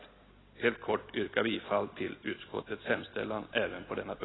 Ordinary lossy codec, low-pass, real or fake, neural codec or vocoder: AAC, 16 kbps; 7.2 kHz; real; none